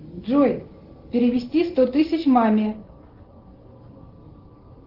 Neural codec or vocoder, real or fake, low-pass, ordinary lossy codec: none; real; 5.4 kHz; Opus, 16 kbps